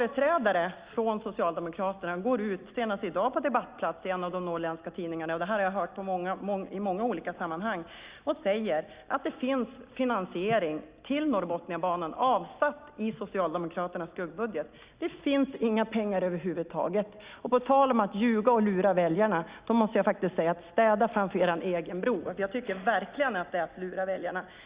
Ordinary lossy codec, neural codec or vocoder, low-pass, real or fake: Opus, 64 kbps; none; 3.6 kHz; real